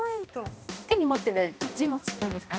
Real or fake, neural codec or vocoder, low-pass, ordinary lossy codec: fake; codec, 16 kHz, 1 kbps, X-Codec, HuBERT features, trained on balanced general audio; none; none